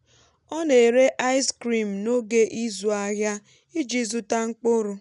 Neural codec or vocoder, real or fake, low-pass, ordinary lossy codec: none; real; 10.8 kHz; none